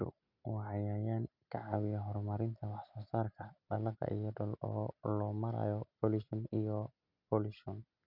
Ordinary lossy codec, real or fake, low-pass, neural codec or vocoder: none; real; 5.4 kHz; none